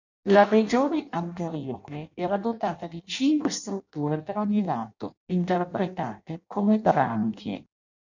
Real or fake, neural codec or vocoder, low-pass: fake; codec, 16 kHz in and 24 kHz out, 0.6 kbps, FireRedTTS-2 codec; 7.2 kHz